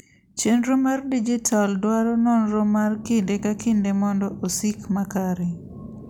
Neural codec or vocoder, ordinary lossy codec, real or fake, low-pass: none; none; real; 19.8 kHz